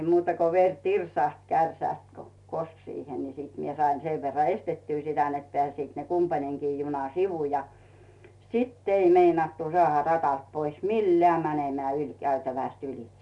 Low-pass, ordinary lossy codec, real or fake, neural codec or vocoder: 10.8 kHz; none; real; none